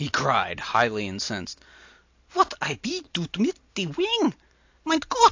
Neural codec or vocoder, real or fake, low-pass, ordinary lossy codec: none; real; 7.2 kHz; AAC, 48 kbps